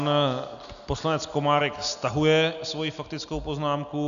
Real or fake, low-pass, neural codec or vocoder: real; 7.2 kHz; none